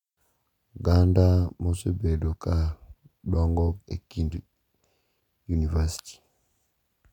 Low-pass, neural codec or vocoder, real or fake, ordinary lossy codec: 19.8 kHz; none; real; none